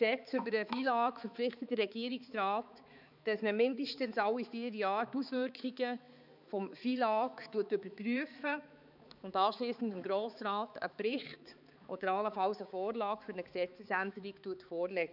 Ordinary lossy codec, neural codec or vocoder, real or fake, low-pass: none; codec, 16 kHz, 4 kbps, X-Codec, HuBERT features, trained on balanced general audio; fake; 5.4 kHz